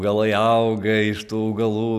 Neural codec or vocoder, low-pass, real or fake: none; 14.4 kHz; real